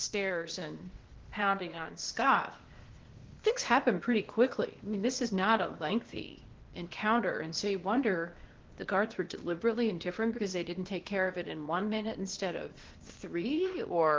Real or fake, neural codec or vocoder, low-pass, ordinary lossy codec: fake; codec, 16 kHz, 0.8 kbps, ZipCodec; 7.2 kHz; Opus, 16 kbps